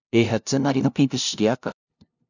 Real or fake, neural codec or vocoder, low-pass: fake; codec, 16 kHz, 0.5 kbps, FunCodec, trained on LibriTTS, 25 frames a second; 7.2 kHz